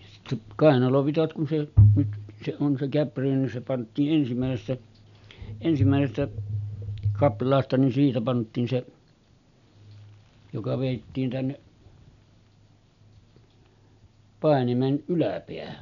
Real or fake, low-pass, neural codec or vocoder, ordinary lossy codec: real; 7.2 kHz; none; none